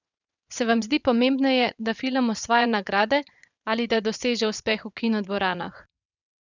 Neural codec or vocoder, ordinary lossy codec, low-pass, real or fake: vocoder, 22.05 kHz, 80 mel bands, WaveNeXt; none; 7.2 kHz; fake